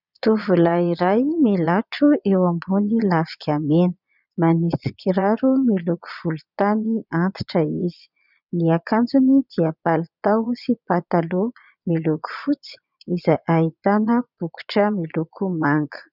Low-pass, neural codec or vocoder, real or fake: 5.4 kHz; vocoder, 22.05 kHz, 80 mel bands, Vocos; fake